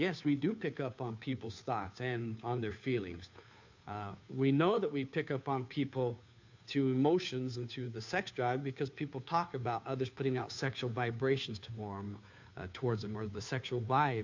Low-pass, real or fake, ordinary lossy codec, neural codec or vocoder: 7.2 kHz; fake; MP3, 48 kbps; codec, 16 kHz, 2 kbps, FunCodec, trained on Chinese and English, 25 frames a second